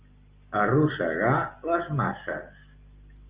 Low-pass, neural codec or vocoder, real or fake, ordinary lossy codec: 3.6 kHz; none; real; Opus, 32 kbps